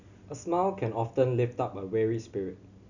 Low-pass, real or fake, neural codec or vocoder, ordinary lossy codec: 7.2 kHz; real; none; none